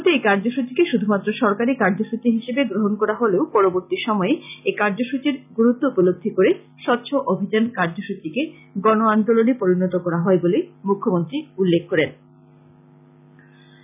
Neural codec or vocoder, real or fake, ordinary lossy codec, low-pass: none; real; none; 3.6 kHz